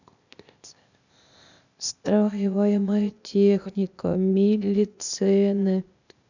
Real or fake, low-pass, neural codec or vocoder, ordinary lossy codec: fake; 7.2 kHz; codec, 16 kHz, 0.8 kbps, ZipCodec; none